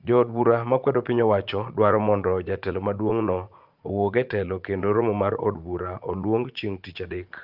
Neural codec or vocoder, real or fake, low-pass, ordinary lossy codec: vocoder, 44.1 kHz, 80 mel bands, Vocos; fake; 5.4 kHz; Opus, 24 kbps